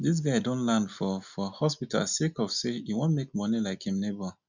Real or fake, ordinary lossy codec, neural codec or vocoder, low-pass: real; none; none; 7.2 kHz